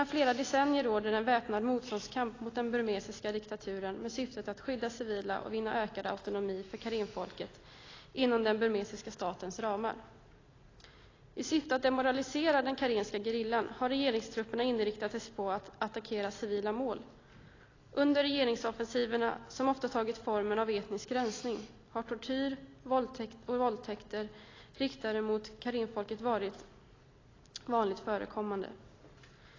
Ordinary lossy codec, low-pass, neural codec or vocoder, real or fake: AAC, 32 kbps; 7.2 kHz; none; real